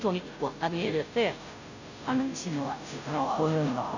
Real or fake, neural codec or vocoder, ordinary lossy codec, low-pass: fake; codec, 16 kHz, 0.5 kbps, FunCodec, trained on Chinese and English, 25 frames a second; none; 7.2 kHz